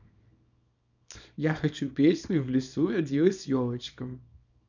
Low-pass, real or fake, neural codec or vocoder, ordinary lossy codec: 7.2 kHz; fake; codec, 24 kHz, 0.9 kbps, WavTokenizer, small release; none